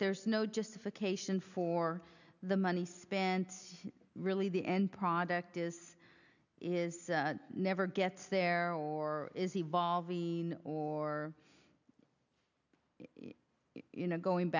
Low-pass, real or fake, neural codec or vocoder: 7.2 kHz; real; none